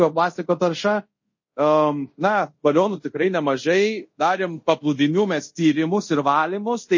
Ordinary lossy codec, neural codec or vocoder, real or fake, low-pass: MP3, 32 kbps; codec, 24 kHz, 0.5 kbps, DualCodec; fake; 7.2 kHz